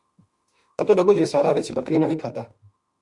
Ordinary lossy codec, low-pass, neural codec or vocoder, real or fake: Opus, 64 kbps; 10.8 kHz; autoencoder, 48 kHz, 32 numbers a frame, DAC-VAE, trained on Japanese speech; fake